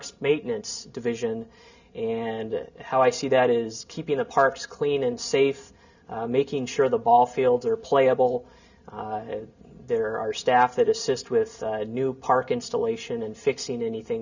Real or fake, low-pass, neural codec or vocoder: real; 7.2 kHz; none